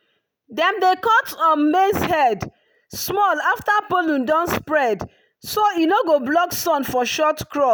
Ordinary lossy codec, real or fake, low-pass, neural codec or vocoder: none; real; none; none